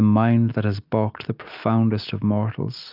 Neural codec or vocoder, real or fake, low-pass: none; real; 5.4 kHz